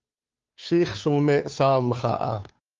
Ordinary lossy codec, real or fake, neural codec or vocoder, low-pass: Opus, 32 kbps; fake; codec, 16 kHz, 2 kbps, FunCodec, trained on Chinese and English, 25 frames a second; 7.2 kHz